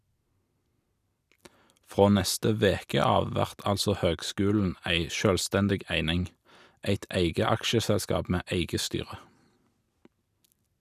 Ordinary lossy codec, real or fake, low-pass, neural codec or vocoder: none; real; 14.4 kHz; none